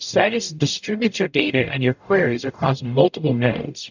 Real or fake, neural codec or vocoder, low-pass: fake; codec, 44.1 kHz, 0.9 kbps, DAC; 7.2 kHz